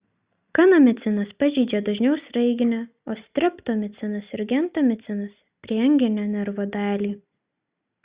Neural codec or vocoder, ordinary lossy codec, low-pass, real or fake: none; Opus, 64 kbps; 3.6 kHz; real